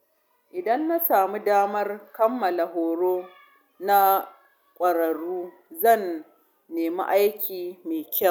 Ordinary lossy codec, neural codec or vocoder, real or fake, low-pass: none; none; real; none